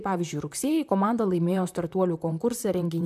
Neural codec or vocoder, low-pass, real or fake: vocoder, 44.1 kHz, 128 mel bands, Pupu-Vocoder; 14.4 kHz; fake